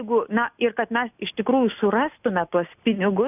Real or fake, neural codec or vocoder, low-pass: real; none; 3.6 kHz